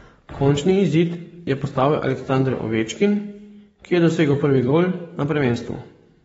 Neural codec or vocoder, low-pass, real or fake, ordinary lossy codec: codec, 44.1 kHz, 7.8 kbps, DAC; 19.8 kHz; fake; AAC, 24 kbps